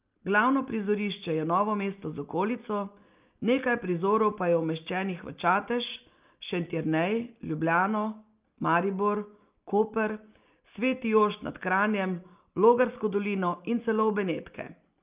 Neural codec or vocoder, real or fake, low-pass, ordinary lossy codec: none; real; 3.6 kHz; Opus, 24 kbps